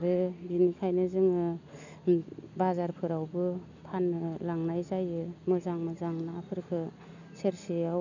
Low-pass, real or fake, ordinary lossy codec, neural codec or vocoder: 7.2 kHz; real; none; none